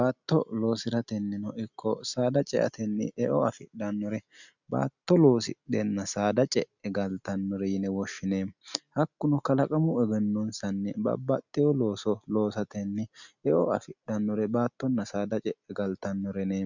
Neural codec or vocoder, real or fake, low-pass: none; real; 7.2 kHz